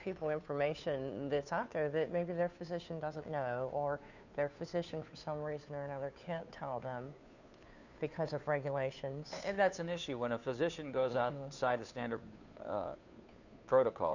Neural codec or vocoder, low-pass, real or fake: codec, 16 kHz, 2 kbps, FunCodec, trained on Chinese and English, 25 frames a second; 7.2 kHz; fake